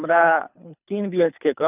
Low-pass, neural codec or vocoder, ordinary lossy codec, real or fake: 3.6 kHz; codec, 24 kHz, 3 kbps, HILCodec; none; fake